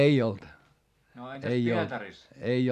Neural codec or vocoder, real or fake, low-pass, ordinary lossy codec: none; real; 14.4 kHz; none